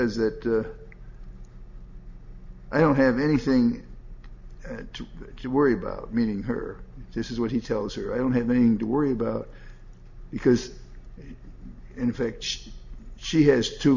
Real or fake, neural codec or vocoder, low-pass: real; none; 7.2 kHz